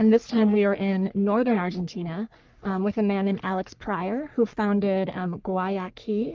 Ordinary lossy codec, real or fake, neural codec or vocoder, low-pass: Opus, 32 kbps; fake; codec, 44.1 kHz, 3.4 kbps, Pupu-Codec; 7.2 kHz